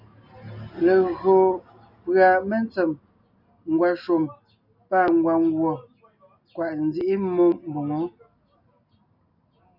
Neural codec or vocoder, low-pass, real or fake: none; 5.4 kHz; real